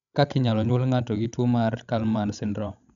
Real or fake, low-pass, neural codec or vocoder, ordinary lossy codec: fake; 7.2 kHz; codec, 16 kHz, 16 kbps, FreqCodec, larger model; none